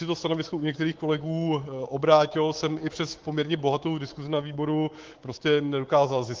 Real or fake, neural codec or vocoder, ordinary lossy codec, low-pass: fake; codec, 24 kHz, 3.1 kbps, DualCodec; Opus, 16 kbps; 7.2 kHz